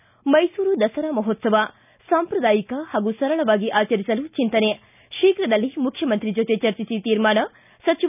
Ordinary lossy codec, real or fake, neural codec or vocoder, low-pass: none; real; none; 3.6 kHz